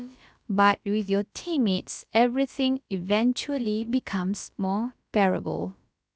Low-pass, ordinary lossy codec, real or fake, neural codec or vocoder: none; none; fake; codec, 16 kHz, about 1 kbps, DyCAST, with the encoder's durations